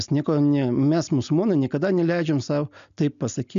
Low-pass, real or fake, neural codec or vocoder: 7.2 kHz; real; none